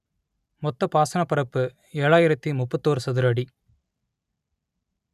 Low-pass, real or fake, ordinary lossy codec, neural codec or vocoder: 14.4 kHz; real; none; none